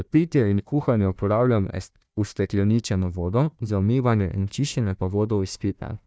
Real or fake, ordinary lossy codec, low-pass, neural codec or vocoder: fake; none; none; codec, 16 kHz, 1 kbps, FunCodec, trained on Chinese and English, 50 frames a second